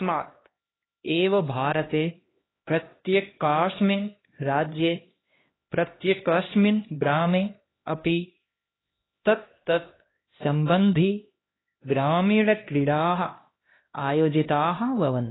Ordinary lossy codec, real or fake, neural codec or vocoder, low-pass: AAC, 16 kbps; fake; codec, 16 kHz, 1 kbps, X-Codec, HuBERT features, trained on LibriSpeech; 7.2 kHz